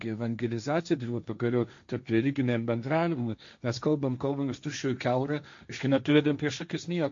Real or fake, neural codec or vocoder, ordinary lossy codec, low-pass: fake; codec, 16 kHz, 1.1 kbps, Voila-Tokenizer; MP3, 48 kbps; 7.2 kHz